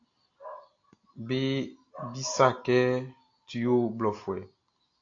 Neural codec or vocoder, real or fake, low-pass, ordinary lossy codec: none; real; 7.2 kHz; AAC, 48 kbps